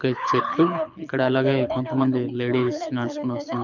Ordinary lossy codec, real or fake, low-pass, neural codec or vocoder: none; fake; 7.2 kHz; codec, 24 kHz, 6 kbps, HILCodec